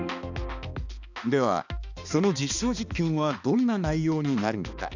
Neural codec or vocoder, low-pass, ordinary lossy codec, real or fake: codec, 16 kHz, 2 kbps, X-Codec, HuBERT features, trained on balanced general audio; 7.2 kHz; none; fake